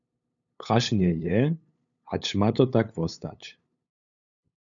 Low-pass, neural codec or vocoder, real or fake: 7.2 kHz; codec, 16 kHz, 8 kbps, FunCodec, trained on LibriTTS, 25 frames a second; fake